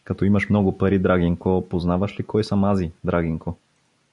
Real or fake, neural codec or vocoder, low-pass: real; none; 10.8 kHz